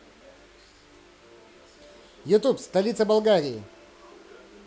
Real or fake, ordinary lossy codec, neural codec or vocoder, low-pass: real; none; none; none